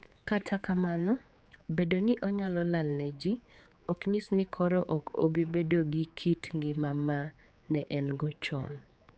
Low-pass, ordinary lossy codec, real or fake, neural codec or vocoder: none; none; fake; codec, 16 kHz, 4 kbps, X-Codec, HuBERT features, trained on general audio